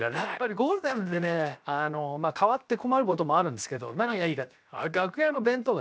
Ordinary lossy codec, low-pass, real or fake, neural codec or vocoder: none; none; fake; codec, 16 kHz, 0.7 kbps, FocalCodec